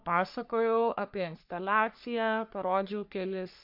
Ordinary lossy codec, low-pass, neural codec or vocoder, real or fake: MP3, 48 kbps; 5.4 kHz; codec, 44.1 kHz, 3.4 kbps, Pupu-Codec; fake